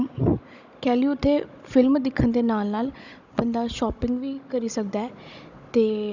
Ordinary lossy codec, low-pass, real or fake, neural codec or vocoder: none; 7.2 kHz; fake; codec, 16 kHz, 16 kbps, FunCodec, trained on Chinese and English, 50 frames a second